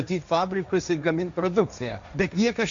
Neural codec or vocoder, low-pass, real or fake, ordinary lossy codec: codec, 16 kHz, 1.1 kbps, Voila-Tokenizer; 7.2 kHz; fake; MP3, 64 kbps